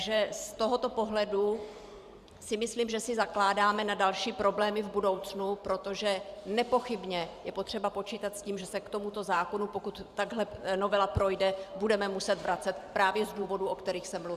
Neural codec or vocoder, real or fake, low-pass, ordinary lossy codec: vocoder, 44.1 kHz, 128 mel bands every 512 samples, BigVGAN v2; fake; 14.4 kHz; Opus, 64 kbps